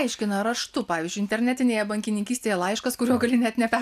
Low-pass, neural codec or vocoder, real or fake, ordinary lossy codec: 14.4 kHz; none; real; AAC, 96 kbps